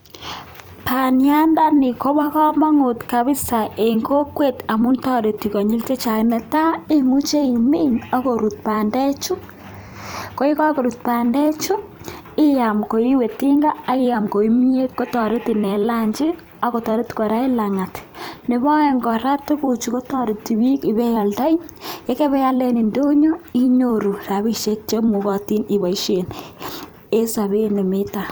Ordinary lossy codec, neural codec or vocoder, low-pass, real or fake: none; vocoder, 44.1 kHz, 128 mel bands every 256 samples, BigVGAN v2; none; fake